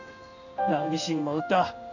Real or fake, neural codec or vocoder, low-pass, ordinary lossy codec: fake; codec, 16 kHz in and 24 kHz out, 1 kbps, XY-Tokenizer; 7.2 kHz; none